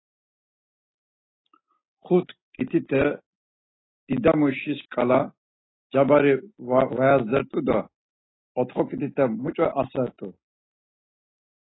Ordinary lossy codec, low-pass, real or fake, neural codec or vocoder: AAC, 16 kbps; 7.2 kHz; real; none